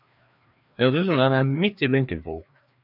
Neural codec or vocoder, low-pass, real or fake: codec, 16 kHz, 2 kbps, FreqCodec, larger model; 5.4 kHz; fake